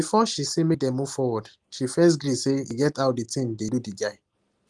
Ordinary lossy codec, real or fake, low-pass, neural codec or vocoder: Opus, 24 kbps; real; 10.8 kHz; none